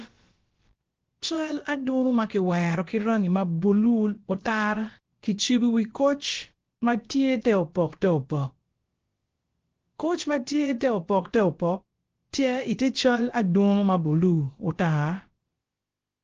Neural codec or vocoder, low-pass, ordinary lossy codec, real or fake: codec, 16 kHz, about 1 kbps, DyCAST, with the encoder's durations; 7.2 kHz; Opus, 16 kbps; fake